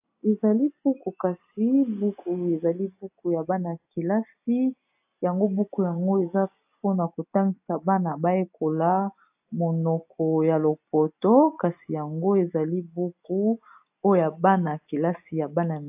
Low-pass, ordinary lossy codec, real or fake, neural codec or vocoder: 3.6 kHz; AAC, 32 kbps; real; none